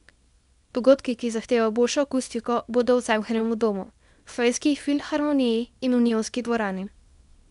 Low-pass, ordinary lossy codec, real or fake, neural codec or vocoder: 10.8 kHz; none; fake; codec, 24 kHz, 0.9 kbps, WavTokenizer, small release